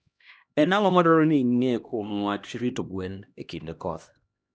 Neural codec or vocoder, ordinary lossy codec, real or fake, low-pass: codec, 16 kHz, 1 kbps, X-Codec, HuBERT features, trained on LibriSpeech; none; fake; none